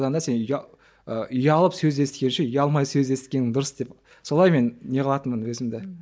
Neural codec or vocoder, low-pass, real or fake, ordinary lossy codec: none; none; real; none